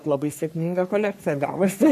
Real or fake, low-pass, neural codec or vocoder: fake; 14.4 kHz; codec, 44.1 kHz, 3.4 kbps, Pupu-Codec